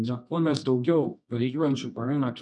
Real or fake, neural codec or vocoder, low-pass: fake; codec, 24 kHz, 0.9 kbps, WavTokenizer, medium music audio release; 10.8 kHz